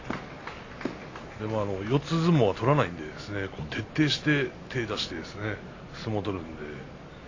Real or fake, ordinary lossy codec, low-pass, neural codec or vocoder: real; AAC, 32 kbps; 7.2 kHz; none